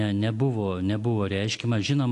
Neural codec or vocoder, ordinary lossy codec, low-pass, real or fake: none; AAC, 64 kbps; 10.8 kHz; real